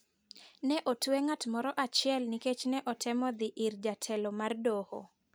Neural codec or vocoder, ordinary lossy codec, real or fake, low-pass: none; none; real; none